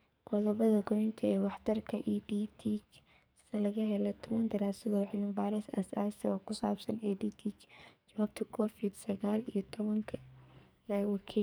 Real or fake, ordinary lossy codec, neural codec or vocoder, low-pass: fake; none; codec, 44.1 kHz, 2.6 kbps, SNAC; none